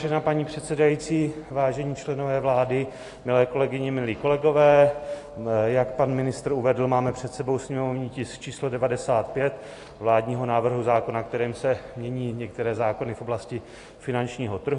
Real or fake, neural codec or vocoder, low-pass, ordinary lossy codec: real; none; 10.8 kHz; AAC, 48 kbps